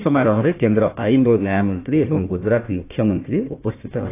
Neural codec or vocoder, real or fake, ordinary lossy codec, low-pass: codec, 16 kHz, 1 kbps, FunCodec, trained on Chinese and English, 50 frames a second; fake; AAC, 24 kbps; 3.6 kHz